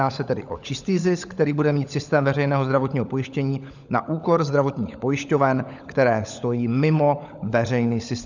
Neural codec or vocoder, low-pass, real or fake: codec, 16 kHz, 16 kbps, FunCodec, trained on LibriTTS, 50 frames a second; 7.2 kHz; fake